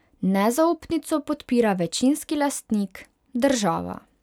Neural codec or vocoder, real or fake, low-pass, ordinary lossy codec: none; real; 19.8 kHz; none